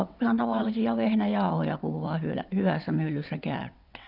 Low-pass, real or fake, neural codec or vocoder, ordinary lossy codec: 5.4 kHz; fake; vocoder, 22.05 kHz, 80 mel bands, WaveNeXt; none